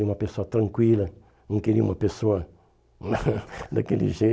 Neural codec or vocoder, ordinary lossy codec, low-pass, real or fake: none; none; none; real